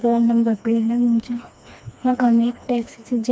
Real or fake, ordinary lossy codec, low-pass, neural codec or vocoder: fake; none; none; codec, 16 kHz, 2 kbps, FreqCodec, smaller model